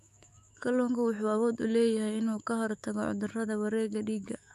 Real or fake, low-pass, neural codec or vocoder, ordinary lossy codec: fake; 14.4 kHz; autoencoder, 48 kHz, 128 numbers a frame, DAC-VAE, trained on Japanese speech; none